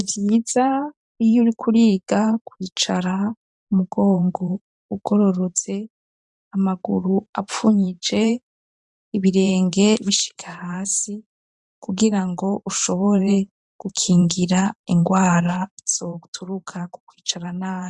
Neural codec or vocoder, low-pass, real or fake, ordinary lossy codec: vocoder, 44.1 kHz, 128 mel bands every 512 samples, BigVGAN v2; 10.8 kHz; fake; MP3, 96 kbps